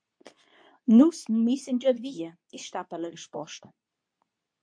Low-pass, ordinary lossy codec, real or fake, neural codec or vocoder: 9.9 kHz; MP3, 48 kbps; fake; codec, 24 kHz, 0.9 kbps, WavTokenizer, medium speech release version 2